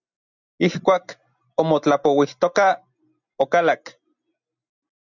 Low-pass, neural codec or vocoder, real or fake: 7.2 kHz; none; real